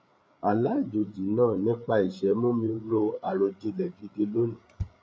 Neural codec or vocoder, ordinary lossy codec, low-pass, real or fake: codec, 16 kHz, 8 kbps, FreqCodec, larger model; none; none; fake